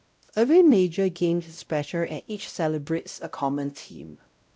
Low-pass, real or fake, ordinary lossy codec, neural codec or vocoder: none; fake; none; codec, 16 kHz, 0.5 kbps, X-Codec, WavLM features, trained on Multilingual LibriSpeech